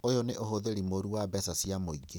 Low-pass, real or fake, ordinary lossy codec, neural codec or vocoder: none; real; none; none